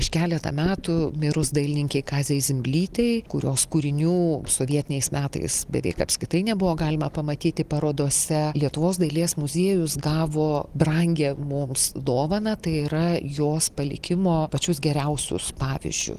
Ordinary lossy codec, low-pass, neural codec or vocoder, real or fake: Opus, 24 kbps; 14.4 kHz; none; real